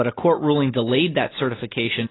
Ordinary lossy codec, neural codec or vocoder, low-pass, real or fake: AAC, 16 kbps; none; 7.2 kHz; real